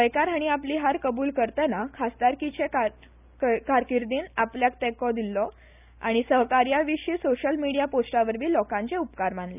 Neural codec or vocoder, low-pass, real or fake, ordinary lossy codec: none; 3.6 kHz; real; none